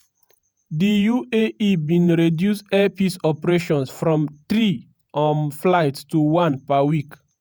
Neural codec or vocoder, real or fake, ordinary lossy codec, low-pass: vocoder, 48 kHz, 128 mel bands, Vocos; fake; none; none